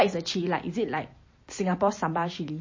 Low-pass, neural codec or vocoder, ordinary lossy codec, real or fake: 7.2 kHz; vocoder, 44.1 kHz, 128 mel bands every 256 samples, BigVGAN v2; MP3, 32 kbps; fake